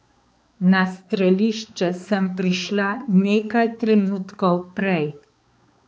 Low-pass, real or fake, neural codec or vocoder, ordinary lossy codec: none; fake; codec, 16 kHz, 2 kbps, X-Codec, HuBERT features, trained on balanced general audio; none